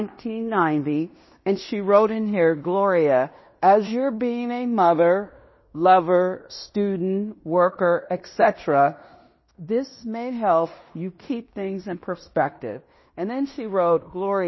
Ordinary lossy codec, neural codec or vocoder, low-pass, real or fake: MP3, 24 kbps; codec, 16 kHz in and 24 kHz out, 0.9 kbps, LongCat-Audio-Codec, fine tuned four codebook decoder; 7.2 kHz; fake